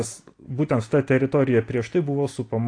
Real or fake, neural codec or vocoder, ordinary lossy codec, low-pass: real; none; AAC, 48 kbps; 9.9 kHz